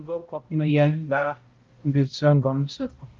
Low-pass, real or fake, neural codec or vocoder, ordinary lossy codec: 7.2 kHz; fake; codec, 16 kHz, 0.5 kbps, X-Codec, HuBERT features, trained on balanced general audio; Opus, 32 kbps